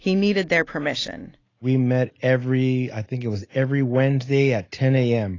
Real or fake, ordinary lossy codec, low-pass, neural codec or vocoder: real; AAC, 32 kbps; 7.2 kHz; none